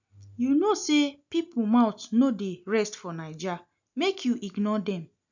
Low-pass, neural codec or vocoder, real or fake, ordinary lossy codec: 7.2 kHz; none; real; none